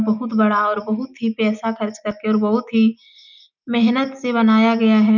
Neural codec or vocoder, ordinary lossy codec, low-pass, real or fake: none; none; 7.2 kHz; real